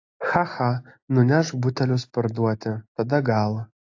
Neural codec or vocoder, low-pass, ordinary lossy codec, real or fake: none; 7.2 kHz; AAC, 48 kbps; real